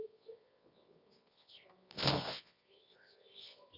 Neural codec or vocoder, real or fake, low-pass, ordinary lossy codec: codec, 24 kHz, 0.9 kbps, WavTokenizer, medium music audio release; fake; 5.4 kHz; Opus, 64 kbps